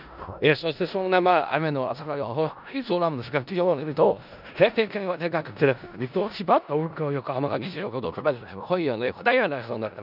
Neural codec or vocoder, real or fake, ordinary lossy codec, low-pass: codec, 16 kHz in and 24 kHz out, 0.4 kbps, LongCat-Audio-Codec, four codebook decoder; fake; none; 5.4 kHz